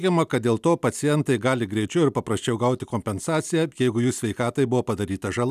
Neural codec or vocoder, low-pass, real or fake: none; 14.4 kHz; real